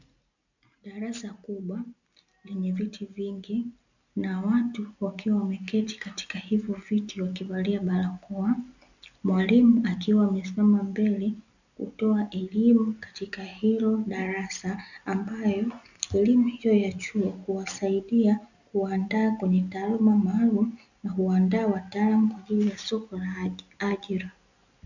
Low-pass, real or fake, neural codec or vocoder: 7.2 kHz; real; none